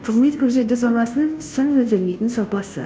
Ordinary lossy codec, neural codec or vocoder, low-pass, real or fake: none; codec, 16 kHz, 0.5 kbps, FunCodec, trained on Chinese and English, 25 frames a second; none; fake